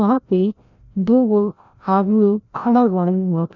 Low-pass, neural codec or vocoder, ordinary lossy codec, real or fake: 7.2 kHz; codec, 16 kHz, 0.5 kbps, FreqCodec, larger model; none; fake